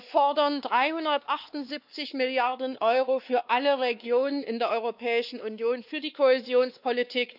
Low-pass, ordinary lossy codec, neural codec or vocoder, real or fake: 5.4 kHz; none; codec, 16 kHz, 2 kbps, X-Codec, WavLM features, trained on Multilingual LibriSpeech; fake